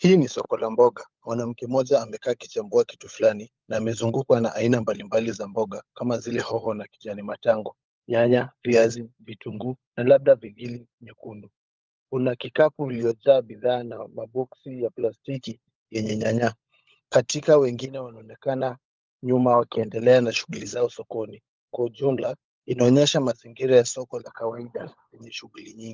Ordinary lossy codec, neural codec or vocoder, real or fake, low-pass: Opus, 24 kbps; codec, 16 kHz, 16 kbps, FunCodec, trained on LibriTTS, 50 frames a second; fake; 7.2 kHz